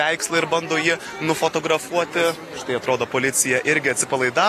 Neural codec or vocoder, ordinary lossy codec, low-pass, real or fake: none; AAC, 48 kbps; 14.4 kHz; real